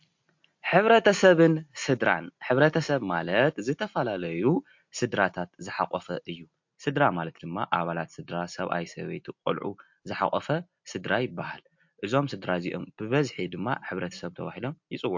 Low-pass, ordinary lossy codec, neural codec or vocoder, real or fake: 7.2 kHz; MP3, 48 kbps; none; real